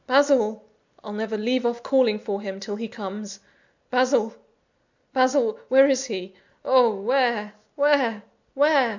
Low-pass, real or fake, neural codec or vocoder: 7.2 kHz; real; none